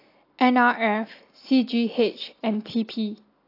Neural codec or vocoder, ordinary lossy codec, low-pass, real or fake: none; AAC, 32 kbps; 5.4 kHz; real